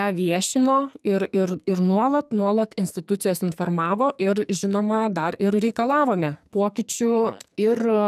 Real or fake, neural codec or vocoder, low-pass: fake; codec, 44.1 kHz, 2.6 kbps, SNAC; 14.4 kHz